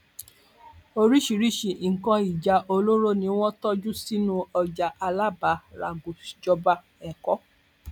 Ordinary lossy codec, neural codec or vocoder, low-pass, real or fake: none; none; 19.8 kHz; real